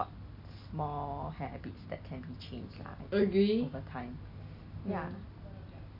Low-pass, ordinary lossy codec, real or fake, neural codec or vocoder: 5.4 kHz; none; real; none